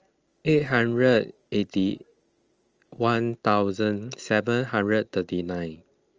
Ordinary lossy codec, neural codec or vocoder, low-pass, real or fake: Opus, 24 kbps; none; 7.2 kHz; real